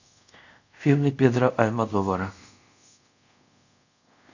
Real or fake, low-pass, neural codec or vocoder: fake; 7.2 kHz; codec, 24 kHz, 0.5 kbps, DualCodec